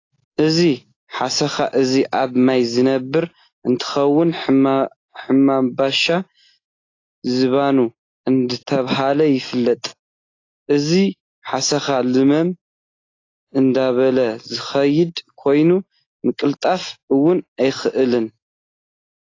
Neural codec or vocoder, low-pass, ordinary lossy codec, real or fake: none; 7.2 kHz; AAC, 32 kbps; real